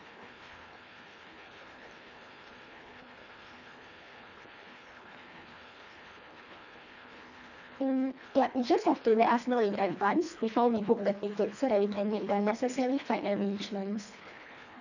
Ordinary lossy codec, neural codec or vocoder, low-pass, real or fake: none; codec, 24 kHz, 1.5 kbps, HILCodec; 7.2 kHz; fake